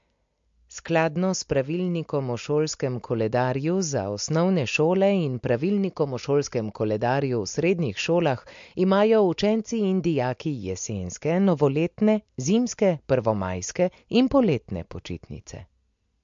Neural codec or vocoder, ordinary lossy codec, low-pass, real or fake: none; MP3, 48 kbps; 7.2 kHz; real